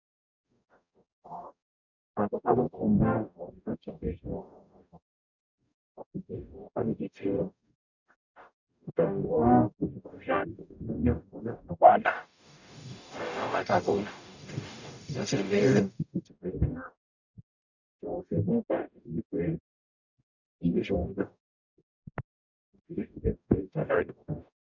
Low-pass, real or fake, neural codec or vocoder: 7.2 kHz; fake; codec, 44.1 kHz, 0.9 kbps, DAC